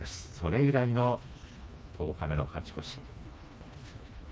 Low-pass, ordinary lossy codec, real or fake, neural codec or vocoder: none; none; fake; codec, 16 kHz, 2 kbps, FreqCodec, smaller model